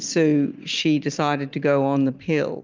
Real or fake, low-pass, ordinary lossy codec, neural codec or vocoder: real; 7.2 kHz; Opus, 32 kbps; none